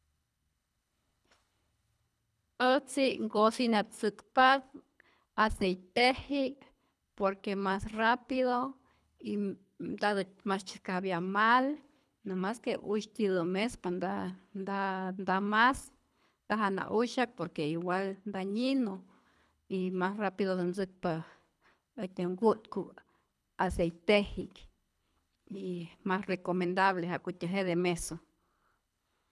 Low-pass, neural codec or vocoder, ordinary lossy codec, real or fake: none; codec, 24 kHz, 3 kbps, HILCodec; none; fake